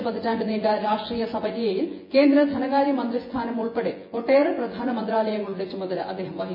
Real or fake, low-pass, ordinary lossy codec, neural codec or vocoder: fake; 5.4 kHz; MP3, 24 kbps; vocoder, 24 kHz, 100 mel bands, Vocos